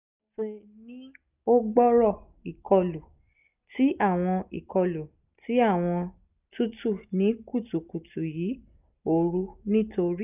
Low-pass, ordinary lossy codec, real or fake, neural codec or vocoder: 3.6 kHz; none; real; none